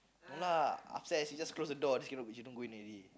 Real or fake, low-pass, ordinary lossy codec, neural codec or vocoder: real; none; none; none